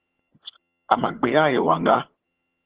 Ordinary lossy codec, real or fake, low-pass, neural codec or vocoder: Opus, 16 kbps; fake; 3.6 kHz; vocoder, 22.05 kHz, 80 mel bands, HiFi-GAN